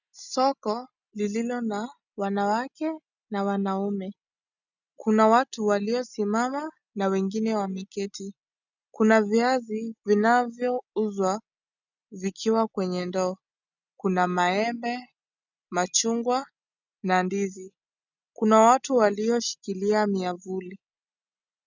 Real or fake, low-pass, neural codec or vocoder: real; 7.2 kHz; none